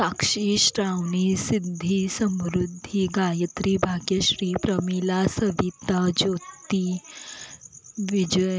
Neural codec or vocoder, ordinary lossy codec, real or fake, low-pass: none; none; real; none